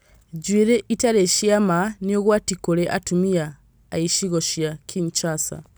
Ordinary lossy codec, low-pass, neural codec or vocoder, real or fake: none; none; none; real